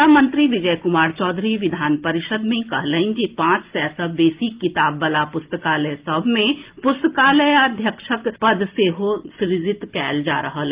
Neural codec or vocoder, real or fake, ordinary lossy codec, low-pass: none; real; Opus, 32 kbps; 3.6 kHz